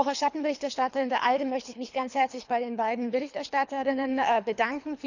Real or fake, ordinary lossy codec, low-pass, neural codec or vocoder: fake; none; 7.2 kHz; codec, 24 kHz, 3 kbps, HILCodec